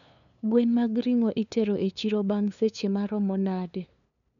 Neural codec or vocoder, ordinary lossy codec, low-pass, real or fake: codec, 16 kHz, 2 kbps, FunCodec, trained on LibriTTS, 25 frames a second; none; 7.2 kHz; fake